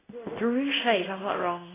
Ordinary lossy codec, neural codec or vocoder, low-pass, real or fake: AAC, 16 kbps; codec, 16 kHz in and 24 kHz out, 1 kbps, XY-Tokenizer; 3.6 kHz; fake